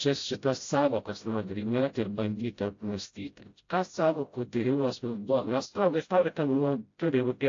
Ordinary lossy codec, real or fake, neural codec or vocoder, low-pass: AAC, 48 kbps; fake; codec, 16 kHz, 0.5 kbps, FreqCodec, smaller model; 7.2 kHz